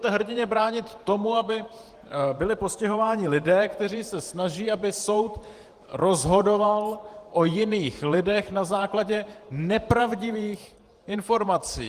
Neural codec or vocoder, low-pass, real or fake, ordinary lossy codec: vocoder, 48 kHz, 128 mel bands, Vocos; 14.4 kHz; fake; Opus, 24 kbps